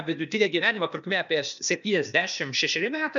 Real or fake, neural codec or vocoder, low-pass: fake; codec, 16 kHz, 0.8 kbps, ZipCodec; 7.2 kHz